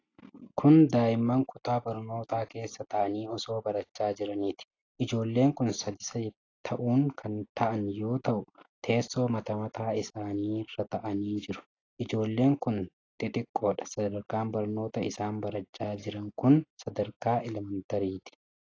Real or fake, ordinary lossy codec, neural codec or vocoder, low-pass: real; AAC, 32 kbps; none; 7.2 kHz